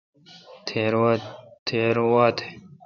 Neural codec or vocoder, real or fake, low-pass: vocoder, 44.1 kHz, 128 mel bands every 512 samples, BigVGAN v2; fake; 7.2 kHz